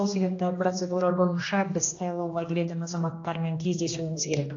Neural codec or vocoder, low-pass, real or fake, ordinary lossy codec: codec, 16 kHz, 1 kbps, X-Codec, HuBERT features, trained on general audio; 7.2 kHz; fake; AAC, 48 kbps